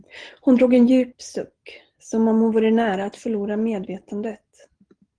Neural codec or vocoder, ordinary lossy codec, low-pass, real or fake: none; Opus, 16 kbps; 9.9 kHz; real